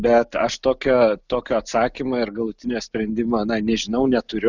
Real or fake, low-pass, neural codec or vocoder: real; 7.2 kHz; none